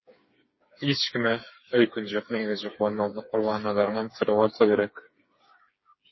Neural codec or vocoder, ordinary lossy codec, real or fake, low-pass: codec, 16 kHz, 4 kbps, FreqCodec, smaller model; MP3, 24 kbps; fake; 7.2 kHz